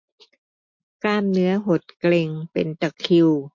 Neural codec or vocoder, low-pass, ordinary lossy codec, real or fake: none; 7.2 kHz; MP3, 48 kbps; real